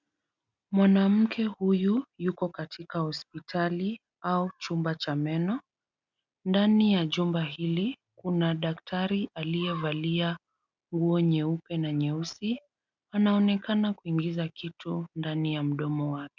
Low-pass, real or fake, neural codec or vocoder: 7.2 kHz; real; none